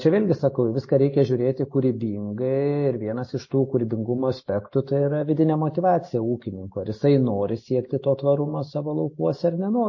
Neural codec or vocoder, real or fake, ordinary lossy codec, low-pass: none; real; MP3, 32 kbps; 7.2 kHz